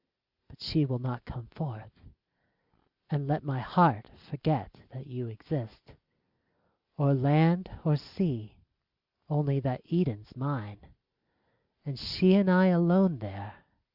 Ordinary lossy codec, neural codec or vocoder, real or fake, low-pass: Opus, 64 kbps; none; real; 5.4 kHz